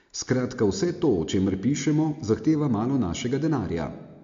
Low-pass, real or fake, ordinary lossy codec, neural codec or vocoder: 7.2 kHz; real; MP3, 48 kbps; none